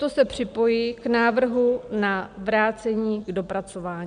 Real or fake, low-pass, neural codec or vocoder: real; 9.9 kHz; none